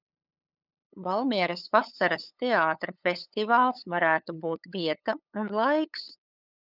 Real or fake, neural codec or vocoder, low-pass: fake; codec, 16 kHz, 8 kbps, FunCodec, trained on LibriTTS, 25 frames a second; 5.4 kHz